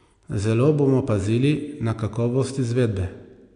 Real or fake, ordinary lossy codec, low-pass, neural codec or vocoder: real; MP3, 96 kbps; 9.9 kHz; none